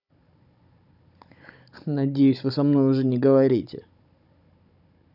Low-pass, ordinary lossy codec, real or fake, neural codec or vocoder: 5.4 kHz; none; fake; codec, 16 kHz, 16 kbps, FunCodec, trained on Chinese and English, 50 frames a second